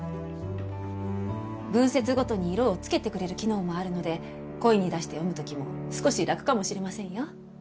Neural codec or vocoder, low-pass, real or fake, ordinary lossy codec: none; none; real; none